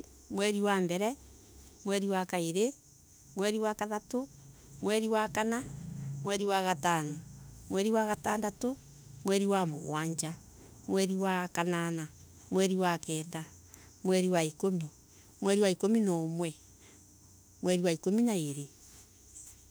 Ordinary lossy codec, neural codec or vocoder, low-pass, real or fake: none; autoencoder, 48 kHz, 32 numbers a frame, DAC-VAE, trained on Japanese speech; none; fake